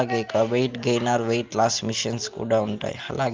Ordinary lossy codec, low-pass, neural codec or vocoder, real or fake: Opus, 24 kbps; 7.2 kHz; none; real